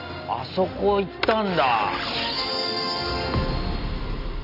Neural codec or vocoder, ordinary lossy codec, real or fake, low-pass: none; none; real; 5.4 kHz